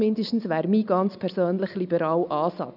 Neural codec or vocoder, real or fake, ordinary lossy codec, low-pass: none; real; none; 5.4 kHz